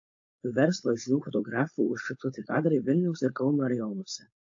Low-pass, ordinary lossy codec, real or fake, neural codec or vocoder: 7.2 kHz; AAC, 48 kbps; fake; codec, 16 kHz, 4.8 kbps, FACodec